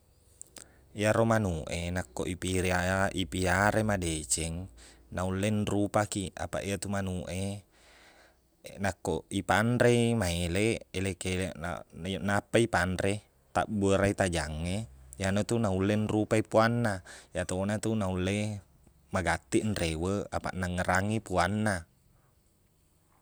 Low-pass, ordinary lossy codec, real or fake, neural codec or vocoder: none; none; real; none